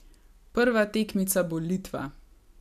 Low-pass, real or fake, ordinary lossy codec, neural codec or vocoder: 14.4 kHz; real; none; none